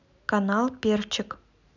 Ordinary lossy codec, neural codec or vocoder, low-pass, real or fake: none; none; 7.2 kHz; real